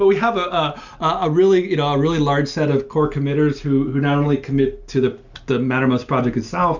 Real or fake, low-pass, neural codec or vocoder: real; 7.2 kHz; none